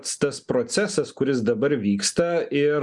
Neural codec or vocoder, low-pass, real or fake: none; 10.8 kHz; real